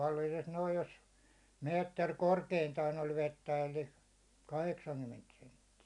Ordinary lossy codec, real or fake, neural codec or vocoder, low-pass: MP3, 96 kbps; real; none; 10.8 kHz